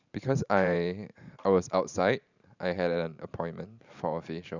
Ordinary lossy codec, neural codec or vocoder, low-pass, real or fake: none; vocoder, 44.1 kHz, 128 mel bands every 512 samples, BigVGAN v2; 7.2 kHz; fake